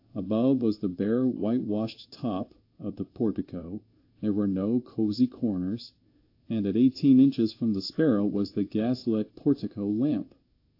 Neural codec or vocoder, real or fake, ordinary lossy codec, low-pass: codec, 16 kHz in and 24 kHz out, 1 kbps, XY-Tokenizer; fake; AAC, 32 kbps; 5.4 kHz